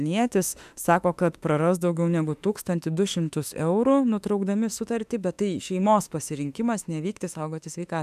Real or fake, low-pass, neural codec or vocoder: fake; 14.4 kHz; autoencoder, 48 kHz, 32 numbers a frame, DAC-VAE, trained on Japanese speech